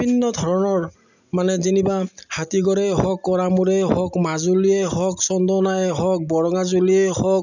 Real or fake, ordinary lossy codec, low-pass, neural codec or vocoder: real; none; 7.2 kHz; none